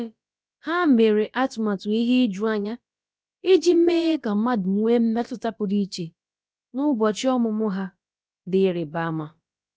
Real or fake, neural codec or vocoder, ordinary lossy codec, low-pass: fake; codec, 16 kHz, about 1 kbps, DyCAST, with the encoder's durations; none; none